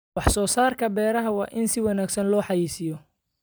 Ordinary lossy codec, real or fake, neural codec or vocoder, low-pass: none; fake; vocoder, 44.1 kHz, 128 mel bands every 256 samples, BigVGAN v2; none